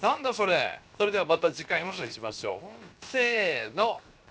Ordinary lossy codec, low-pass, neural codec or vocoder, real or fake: none; none; codec, 16 kHz, 0.7 kbps, FocalCodec; fake